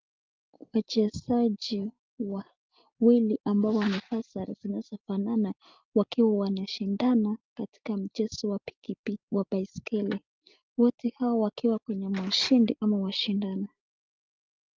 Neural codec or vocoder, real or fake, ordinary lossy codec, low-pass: none; real; Opus, 24 kbps; 7.2 kHz